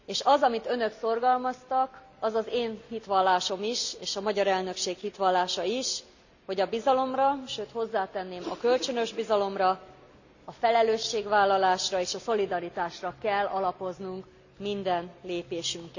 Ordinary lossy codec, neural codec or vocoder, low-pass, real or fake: none; none; 7.2 kHz; real